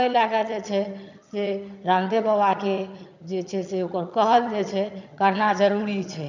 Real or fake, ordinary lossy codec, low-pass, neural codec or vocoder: fake; none; 7.2 kHz; vocoder, 22.05 kHz, 80 mel bands, HiFi-GAN